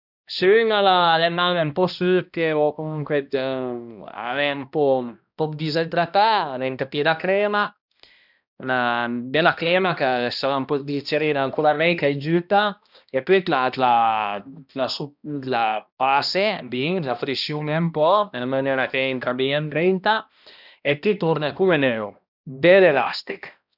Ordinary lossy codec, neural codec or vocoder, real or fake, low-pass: none; codec, 16 kHz, 1 kbps, X-Codec, HuBERT features, trained on balanced general audio; fake; 5.4 kHz